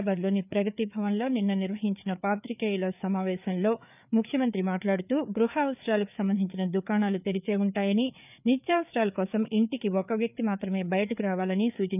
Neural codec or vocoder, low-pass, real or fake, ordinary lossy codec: codec, 16 kHz, 4 kbps, FreqCodec, larger model; 3.6 kHz; fake; none